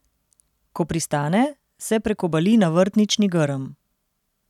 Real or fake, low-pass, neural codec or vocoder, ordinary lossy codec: real; 19.8 kHz; none; none